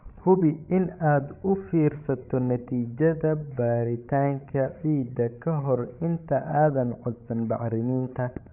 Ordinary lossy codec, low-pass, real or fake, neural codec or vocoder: none; 3.6 kHz; fake; codec, 16 kHz, 16 kbps, FreqCodec, larger model